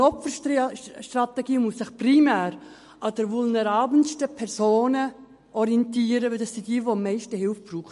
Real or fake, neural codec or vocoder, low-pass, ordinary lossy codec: real; none; 14.4 kHz; MP3, 48 kbps